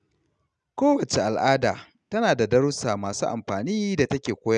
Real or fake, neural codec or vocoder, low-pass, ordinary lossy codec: real; none; 9.9 kHz; none